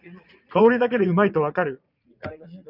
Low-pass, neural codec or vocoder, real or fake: 5.4 kHz; vocoder, 22.05 kHz, 80 mel bands, Vocos; fake